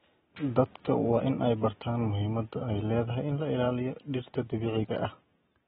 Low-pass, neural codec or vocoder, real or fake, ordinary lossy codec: 19.8 kHz; none; real; AAC, 16 kbps